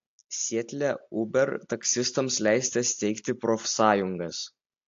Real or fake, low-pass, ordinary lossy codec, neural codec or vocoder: real; 7.2 kHz; AAC, 48 kbps; none